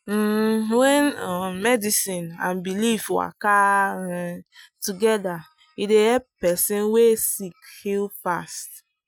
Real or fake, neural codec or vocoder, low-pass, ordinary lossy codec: real; none; none; none